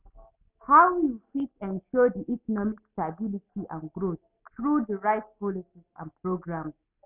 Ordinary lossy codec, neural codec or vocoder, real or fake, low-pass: none; none; real; 3.6 kHz